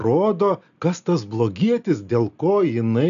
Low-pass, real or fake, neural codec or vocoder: 7.2 kHz; real; none